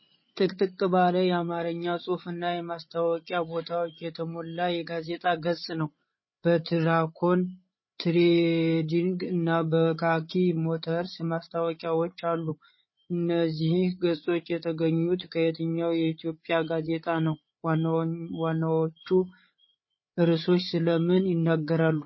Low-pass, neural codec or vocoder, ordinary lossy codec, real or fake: 7.2 kHz; codec, 16 kHz, 8 kbps, FreqCodec, larger model; MP3, 24 kbps; fake